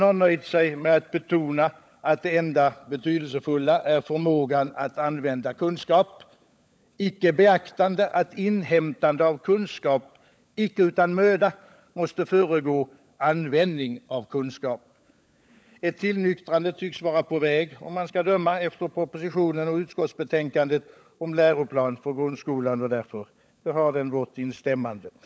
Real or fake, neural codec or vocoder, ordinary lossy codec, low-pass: fake; codec, 16 kHz, 16 kbps, FunCodec, trained on LibriTTS, 50 frames a second; none; none